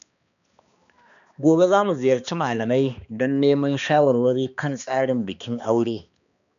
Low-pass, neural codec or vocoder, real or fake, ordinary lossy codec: 7.2 kHz; codec, 16 kHz, 2 kbps, X-Codec, HuBERT features, trained on balanced general audio; fake; none